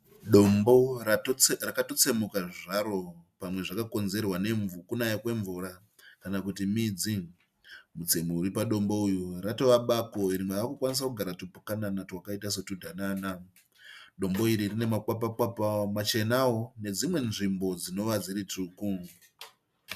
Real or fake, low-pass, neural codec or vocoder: real; 14.4 kHz; none